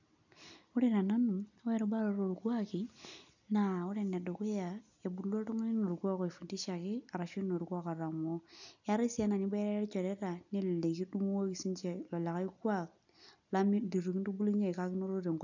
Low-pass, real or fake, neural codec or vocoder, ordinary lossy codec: 7.2 kHz; real; none; none